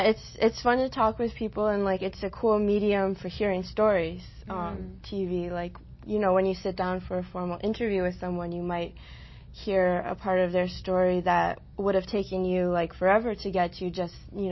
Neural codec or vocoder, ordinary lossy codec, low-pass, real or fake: none; MP3, 24 kbps; 7.2 kHz; real